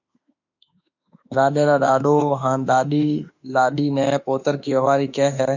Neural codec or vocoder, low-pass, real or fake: autoencoder, 48 kHz, 32 numbers a frame, DAC-VAE, trained on Japanese speech; 7.2 kHz; fake